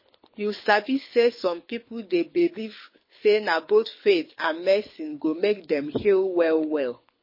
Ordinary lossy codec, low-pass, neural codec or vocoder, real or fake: MP3, 24 kbps; 5.4 kHz; codec, 24 kHz, 6 kbps, HILCodec; fake